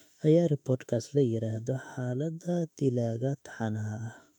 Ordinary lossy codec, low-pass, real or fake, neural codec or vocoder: MP3, 96 kbps; 19.8 kHz; fake; autoencoder, 48 kHz, 32 numbers a frame, DAC-VAE, trained on Japanese speech